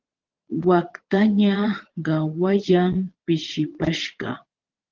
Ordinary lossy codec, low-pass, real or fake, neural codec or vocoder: Opus, 16 kbps; 7.2 kHz; fake; vocoder, 22.05 kHz, 80 mel bands, Vocos